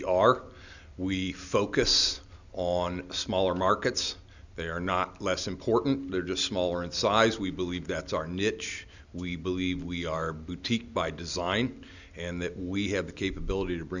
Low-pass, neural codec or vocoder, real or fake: 7.2 kHz; none; real